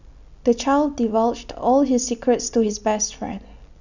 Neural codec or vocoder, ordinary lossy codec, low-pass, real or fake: none; none; 7.2 kHz; real